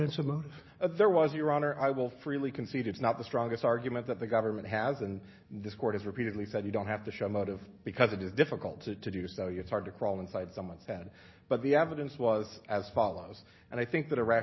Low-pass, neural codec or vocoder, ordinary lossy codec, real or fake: 7.2 kHz; none; MP3, 24 kbps; real